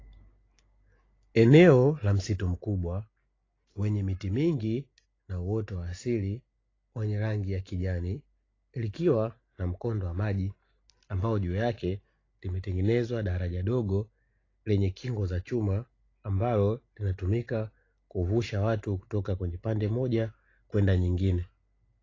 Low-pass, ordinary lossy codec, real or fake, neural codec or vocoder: 7.2 kHz; AAC, 32 kbps; real; none